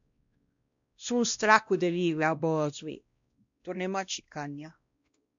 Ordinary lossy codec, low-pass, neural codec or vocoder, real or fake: MP3, 64 kbps; 7.2 kHz; codec, 16 kHz, 1 kbps, X-Codec, WavLM features, trained on Multilingual LibriSpeech; fake